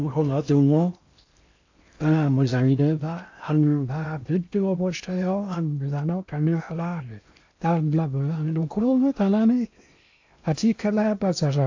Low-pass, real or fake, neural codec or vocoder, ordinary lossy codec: 7.2 kHz; fake; codec, 16 kHz in and 24 kHz out, 0.6 kbps, FocalCodec, streaming, 4096 codes; AAC, 48 kbps